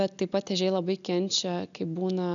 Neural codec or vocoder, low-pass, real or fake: none; 7.2 kHz; real